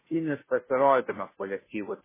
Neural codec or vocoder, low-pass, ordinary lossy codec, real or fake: codec, 16 kHz, 0.5 kbps, FunCodec, trained on Chinese and English, 25 frames a second; 3.6 kHz; MP3, 16 kbps; fake